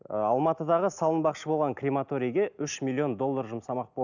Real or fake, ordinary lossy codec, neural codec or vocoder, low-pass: real; none; none; 7.2 kHz